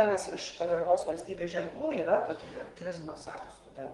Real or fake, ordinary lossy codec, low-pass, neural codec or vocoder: fake; Opus, 24 kbps; 10.8 kHz; codec, 24 kHz, 1 kbps, SNAC